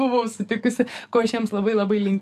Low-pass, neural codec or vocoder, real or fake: 14.4 kHz; codec, 44.1 kHz, 7.8 kbps, DAC; fake